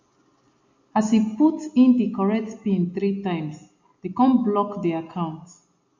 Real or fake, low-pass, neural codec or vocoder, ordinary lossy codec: real; 7.2 kHz; none; MP3, 48 kbps